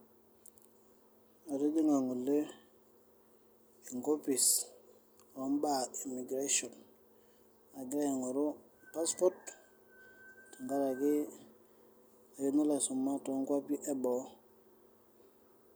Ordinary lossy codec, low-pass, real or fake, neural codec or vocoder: none; none; real; none